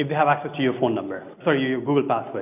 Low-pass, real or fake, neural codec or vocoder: 3.6 kHz; real; none